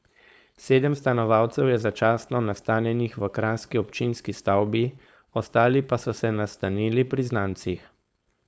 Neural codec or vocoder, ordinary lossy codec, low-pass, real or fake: codec, 16 kHz, 4.8 kbps, FACodec; none; none; fake